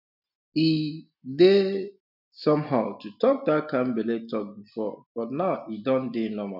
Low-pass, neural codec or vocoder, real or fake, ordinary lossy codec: 5.4 kHz; none; real; none